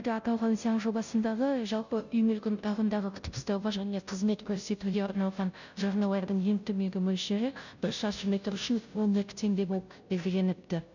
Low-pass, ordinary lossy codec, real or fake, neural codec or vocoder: 7.2 kHz; none; fake; codec, 16 kHz, 0.5 kbps, FunCodec, trained on Chinese and English, 25 frames a second